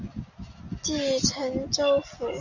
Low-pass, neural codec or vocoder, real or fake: 7.2 kHz; none; real